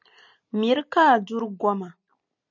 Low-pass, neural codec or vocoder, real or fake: 7.2 kHz; none; real